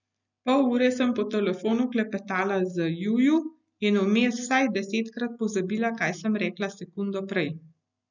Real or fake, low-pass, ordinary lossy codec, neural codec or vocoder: real; 7.2 kHz; none; none